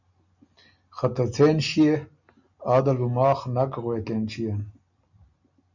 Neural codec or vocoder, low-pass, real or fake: none; 7.2 kHz; real